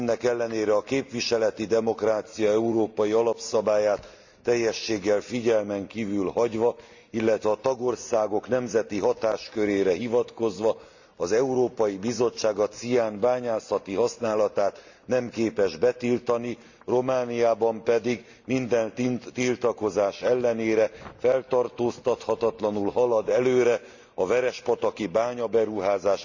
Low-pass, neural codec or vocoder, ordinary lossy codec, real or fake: 7.2 kHz; none; Opus, 64 kbps; real